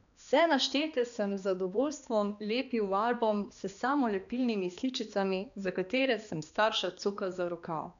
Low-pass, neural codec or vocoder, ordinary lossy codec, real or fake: 7.2 kHz; codec, 16 kHz, 2 kbps, X-Codec, HuBERT features, trained on balanced general audio; none; fake